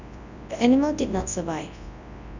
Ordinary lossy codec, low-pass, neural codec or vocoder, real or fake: none; 7.2 kHz; codec, 24 kHz, 0.9 kbps, WavTokenizer, large speech release; fake